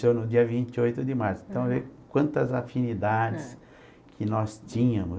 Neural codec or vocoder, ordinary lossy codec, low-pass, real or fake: none; none; none; real